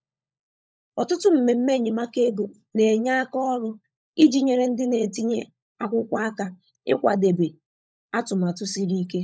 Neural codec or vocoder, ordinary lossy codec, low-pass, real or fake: codec, 16 kHz, 16 kbps, FunCodec, trained on LibriTTS, 50 frames a second; none; none; fake